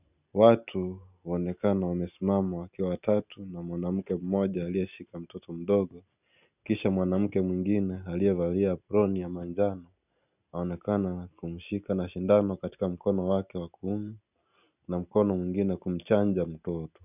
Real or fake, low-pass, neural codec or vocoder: real; 3.6 kHz; none